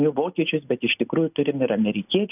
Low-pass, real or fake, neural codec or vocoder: 3.6 kHz; real; none